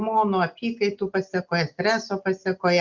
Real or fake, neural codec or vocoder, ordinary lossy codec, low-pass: real; none; Opus, 64 kbps; 7.2 kHz